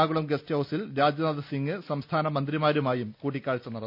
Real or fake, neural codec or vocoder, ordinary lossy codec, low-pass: real; none; none; 5.4 kHz